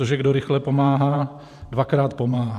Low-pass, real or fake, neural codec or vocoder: 14.4 kHz; fake; vocoder, 44.1 kHz, 128 mel bands every 512 samples, BigVGAN v2